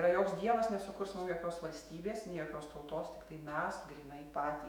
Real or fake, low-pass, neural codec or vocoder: fake; 19.8 kHz; autoencoder, 48 kHz, 128 numbers a frame, DAC-VAE, trained on Japanese speech